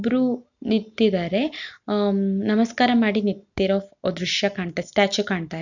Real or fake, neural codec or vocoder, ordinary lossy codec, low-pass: real; none; none; 7.2 kHz